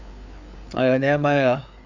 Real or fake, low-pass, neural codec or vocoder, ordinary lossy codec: fake; 7.2 kHz; codec, 16 kHz, 4 kbps, FreqCodec, larger model; none